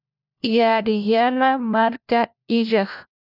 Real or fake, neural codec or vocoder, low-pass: fake; codec, 16 kHz, 1 kbps, FunCodec, trained on LibriTTS, 50 frames a second; 5.4 kHz